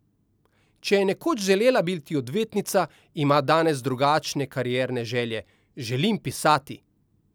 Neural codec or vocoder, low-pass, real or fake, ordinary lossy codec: none; none; real; none